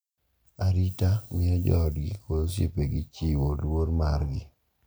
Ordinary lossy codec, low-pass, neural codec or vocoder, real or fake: none; none; none; real